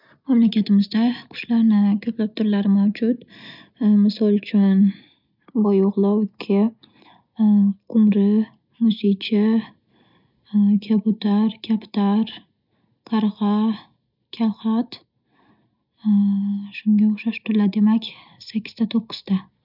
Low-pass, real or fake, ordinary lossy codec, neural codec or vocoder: 5.4 kHz; real; none; none